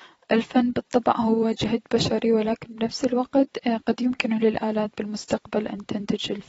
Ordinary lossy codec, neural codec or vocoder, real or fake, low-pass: AAC, 24 kbps; vocoder, 44.1 kHz, 128 mel bands every 256 samples, BigVGAN v2; fake; 19.8 kHz